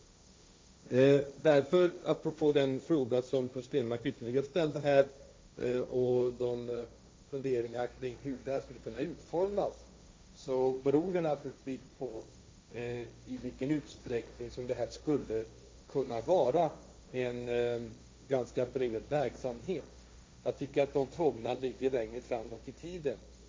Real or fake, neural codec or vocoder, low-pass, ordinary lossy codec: fake; codec, 16 kHz, 1.1 kbps, Voila-Tokenizer; none; none